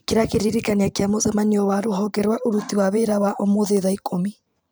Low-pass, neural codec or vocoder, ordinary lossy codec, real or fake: none; vocoder, 44.1 kHz, 128 mel bands every 512 samples, BigVGAN v2; none; fake